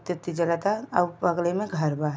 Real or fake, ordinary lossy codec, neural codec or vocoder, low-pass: real; none; none; none